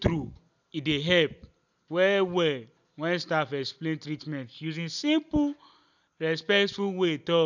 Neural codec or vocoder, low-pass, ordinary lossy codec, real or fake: none; 7.2 kHz; none; real